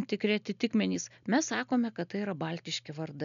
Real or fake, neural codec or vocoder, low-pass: real; none; 7.2 kHz